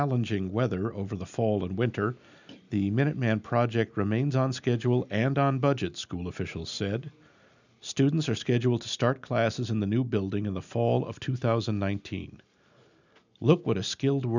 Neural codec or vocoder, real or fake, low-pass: none; real; 7.2 kHz